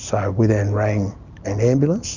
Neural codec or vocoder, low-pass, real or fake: none; 7.2 kHz; real